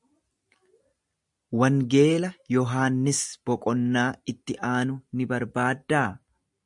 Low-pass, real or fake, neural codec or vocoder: 10.8 kHz; real; none